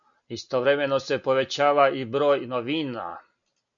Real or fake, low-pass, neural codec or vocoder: real; 7.2 kHz; none